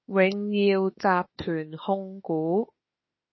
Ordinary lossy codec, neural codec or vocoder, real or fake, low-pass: MP3, 24 kbps; autoencoder, 48 kHz, 32 numbers a frame, DAC-VAE, trained on Japanese speech; fake; 7.2 kHz